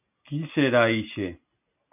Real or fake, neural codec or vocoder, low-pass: real; none; 3.6 kHz